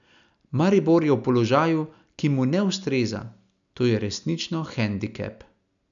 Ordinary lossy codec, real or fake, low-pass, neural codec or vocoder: none; real; 7.2 kHz; none